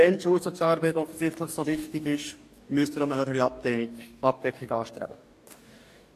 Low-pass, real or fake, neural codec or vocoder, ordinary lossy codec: 14.4 kHz; fake; codec, 44.1 kHz, 2.6 kbps, DAC; AAC, 64 kbps